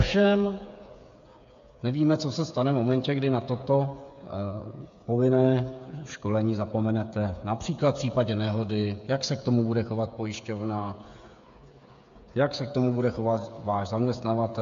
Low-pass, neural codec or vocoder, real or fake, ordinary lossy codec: 7.2 kHz; codec, 16 kHz, 8 kbps, FreqCodec, smaller model; fake; AAC, 64 kbps